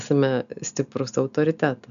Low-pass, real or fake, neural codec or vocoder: 7.2 kHz; real; none